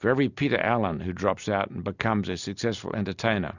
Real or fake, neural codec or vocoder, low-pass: real; none; 7.2 kHz